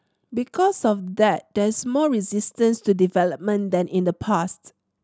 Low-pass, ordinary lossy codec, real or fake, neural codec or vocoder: none; none; real; none